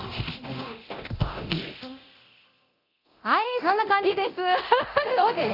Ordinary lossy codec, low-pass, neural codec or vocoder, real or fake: none; 5.4 kHz; codec, 24 kHz, 0.9 kbps, DualCodec; fake